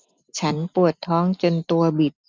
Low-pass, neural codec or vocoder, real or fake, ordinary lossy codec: none; none; real; none